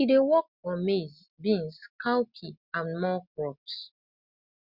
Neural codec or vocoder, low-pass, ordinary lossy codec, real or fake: none; 5.4 kHz; Opus, 64 kbps; real